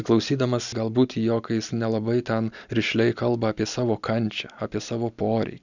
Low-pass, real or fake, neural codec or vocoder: 7.2 kHz; real; none